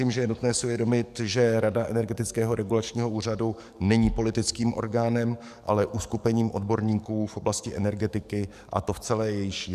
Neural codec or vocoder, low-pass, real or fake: codec, 44.1 kHz, 7.8 kbps, DAC; 14.4 kHz; fake